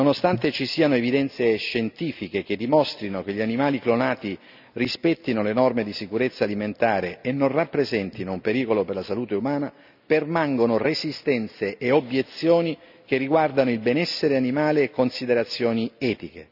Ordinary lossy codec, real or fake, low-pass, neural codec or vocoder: none; real; 5.4 kHz; none